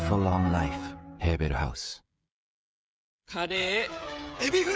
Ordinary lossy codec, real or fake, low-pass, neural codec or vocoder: none; fake; none; codec, 16 kHz, 16 kbps, FreqCodec, smaller model